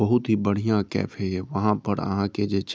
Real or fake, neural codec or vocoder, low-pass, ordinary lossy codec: real; none; none; none